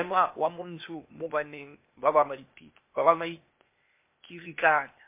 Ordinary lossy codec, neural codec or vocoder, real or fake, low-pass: MP3, 24 kbps; codec, 16 kHz, 0.8 kbps, ZipCodec; fake; 3.6 kHz